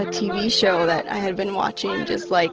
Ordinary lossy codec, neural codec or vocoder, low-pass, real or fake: Opus, 16 kbps; none; 7.2 kHz; real